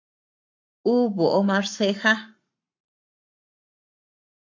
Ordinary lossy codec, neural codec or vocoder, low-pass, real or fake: MP3, 48 kbps; codec, 44.1 kHz, 7.8 kbps, Pupu-Codec; 7.2 kHz; fake